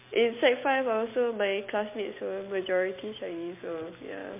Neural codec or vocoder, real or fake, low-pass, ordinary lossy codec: vocoder, 44.1 kHz, 128 mel bands every 256 samples, BigVGAN v2; fake; 3.6 kHz; MP3, 24 kbps